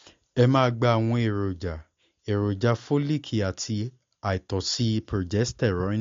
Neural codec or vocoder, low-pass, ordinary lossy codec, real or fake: none; 7.2 kHz; MP3, 48 kbps; real